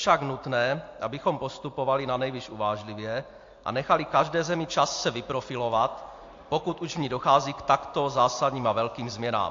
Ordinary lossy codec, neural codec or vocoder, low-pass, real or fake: AAC, 48 kbps; none; 7.2 kHz; real